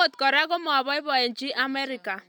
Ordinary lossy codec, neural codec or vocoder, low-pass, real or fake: none; none; none; real